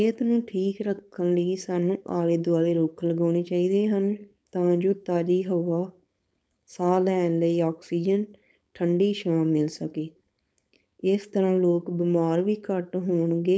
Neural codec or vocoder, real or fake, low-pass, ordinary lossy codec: codec, 16 kHz, 4.8 kbps, FACodec; fake; none; none